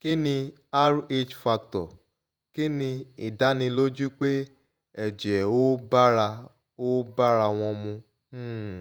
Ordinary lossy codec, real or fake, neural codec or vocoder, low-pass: none; fake; vocoder, 48 kHz, 128 mel bands, Vocos; none